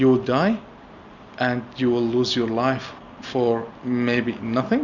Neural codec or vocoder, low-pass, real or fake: none; 7.2 kHz; real